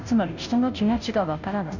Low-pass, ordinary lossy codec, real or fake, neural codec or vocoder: 7.2 kHz; none; fake; codec, 16 kHz, 0.5 kbps, FunCodec, trained on Chinese and English, 25 frames a second